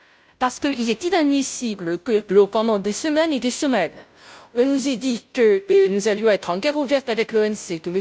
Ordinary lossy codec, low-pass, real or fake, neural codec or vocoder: none; none; fake; codec, 16 kHz, 0.5 kbps, FunCodec, trained on Chinese and English, 25 frames a second